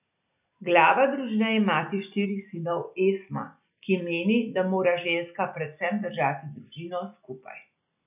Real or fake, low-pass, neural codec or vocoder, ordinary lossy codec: real; 3.6 kHz; none; none